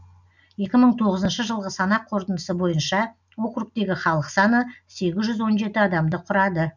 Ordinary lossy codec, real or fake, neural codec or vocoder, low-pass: none; real; none; 7.2 kHz